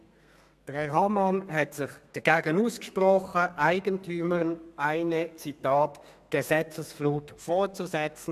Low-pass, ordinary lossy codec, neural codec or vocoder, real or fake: 14.4 kHz; none; codec, 32 kHz, 1.9 kbps, SNAC; fake